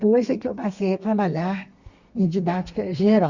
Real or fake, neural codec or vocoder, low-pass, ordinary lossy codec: fake; codec, 32 kHz, 1.9 kbps, SNAC; 7.2 kHz; Opus, 64 kbps